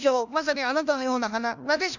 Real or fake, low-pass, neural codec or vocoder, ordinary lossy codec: fake; 7.2 kHz; codec, 16 kHz, 1 kbps, FunCodec, trained on LibriTTS, 50 frames a second; none